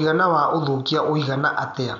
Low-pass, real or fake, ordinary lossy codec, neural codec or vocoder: 7.2 kHz; real; none; none